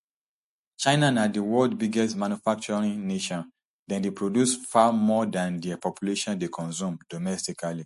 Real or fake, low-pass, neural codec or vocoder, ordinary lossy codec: real; 14.4 kHz; none; MP3, 48 kbps